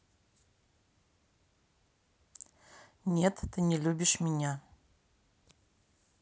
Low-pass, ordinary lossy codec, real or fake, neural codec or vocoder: none; none; real; none